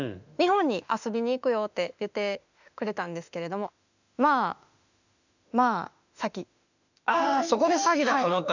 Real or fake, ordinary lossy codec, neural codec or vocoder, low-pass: fake; none; autoencoder, 48 kHz, 32 numbers a frame, DAC-VAE, trained on Japanese speech; 7.2 kHz